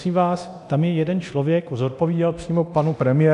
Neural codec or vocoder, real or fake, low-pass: codec, 24 kHz, 0.9 kbps, DualCodec; fake; 10.8 kHz